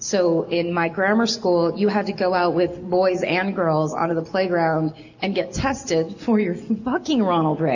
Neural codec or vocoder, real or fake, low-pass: none; real; 7.2 kHz